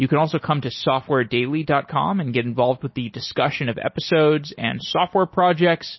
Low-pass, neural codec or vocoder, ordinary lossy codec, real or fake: 7.2 kHz; none; MP3, 24 kbps; real